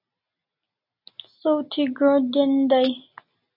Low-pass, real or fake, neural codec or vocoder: 5.4 kHz; real; none